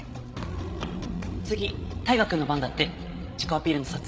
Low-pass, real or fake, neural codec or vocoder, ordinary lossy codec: none; fake; codec, 16 kHz, 16 kbps, FreqCodec, larger model; none